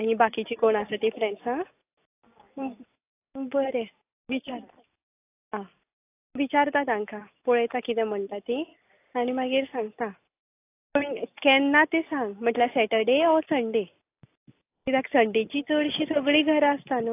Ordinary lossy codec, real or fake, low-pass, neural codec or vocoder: AAC, 24 kbps; real; 3.6 kHz; none